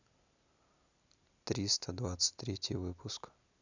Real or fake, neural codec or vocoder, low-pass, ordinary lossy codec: real; none; 7.2 kHz; none